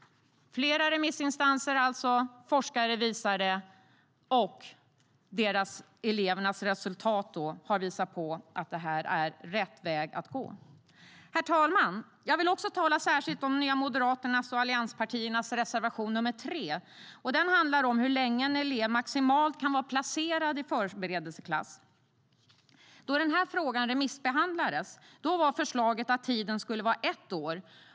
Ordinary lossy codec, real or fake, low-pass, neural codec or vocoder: none; real; none; none